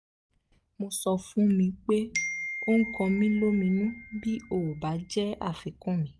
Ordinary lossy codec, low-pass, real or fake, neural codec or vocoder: none; none; real; none